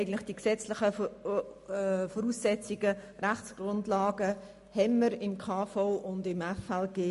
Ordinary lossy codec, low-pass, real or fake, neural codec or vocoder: MP3, 48 kbps; 14.4 kHz; real; none